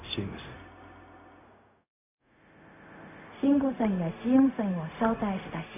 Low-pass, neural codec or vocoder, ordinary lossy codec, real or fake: 3.6 kHz; codec, 16 kHz, 0.4 kbps, LongCat-Audio-Codec; none; fake